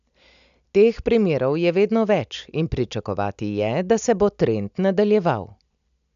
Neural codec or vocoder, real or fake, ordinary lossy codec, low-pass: none; real; none; 7.2 kHz